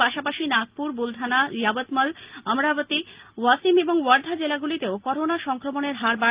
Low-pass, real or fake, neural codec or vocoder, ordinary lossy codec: 3.6 kHz; real; none; Opus, 32 kbps